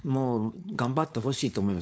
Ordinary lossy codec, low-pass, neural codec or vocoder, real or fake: none; none; codec, 16 kHz, 4.8 kbps, FACodec; fake